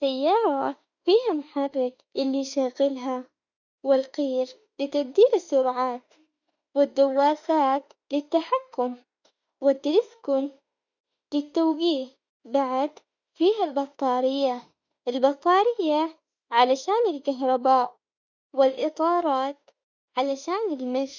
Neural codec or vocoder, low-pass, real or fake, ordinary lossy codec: autoencoder, 48 kHz, 32 numbers a frame, DAC-VAE, trained on Japanese speech; 7.2 kHz; fake; none